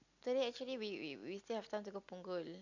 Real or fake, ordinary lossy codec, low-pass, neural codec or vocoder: real; none; 7.2 kHz; none